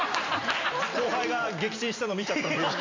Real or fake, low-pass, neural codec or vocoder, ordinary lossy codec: real; 7.2 kHz; none; MP3, 64 kbps